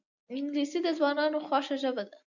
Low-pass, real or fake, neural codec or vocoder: 7.2 kHz; real; none